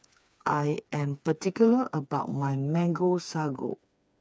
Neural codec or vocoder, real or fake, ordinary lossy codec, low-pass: codec, 16 kHz, 4 kbps, FreqCodec, smaller model; fake; none; none